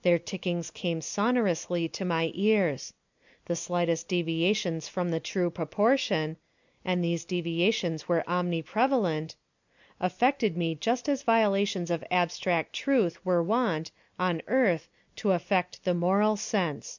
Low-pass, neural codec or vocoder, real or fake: 7.2 kHz; none; real